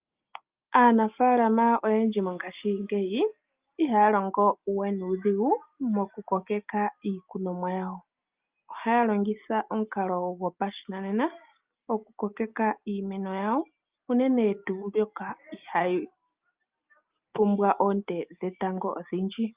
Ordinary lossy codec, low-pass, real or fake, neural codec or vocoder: Opus, 24 kbps; 3.6 kHz; real; none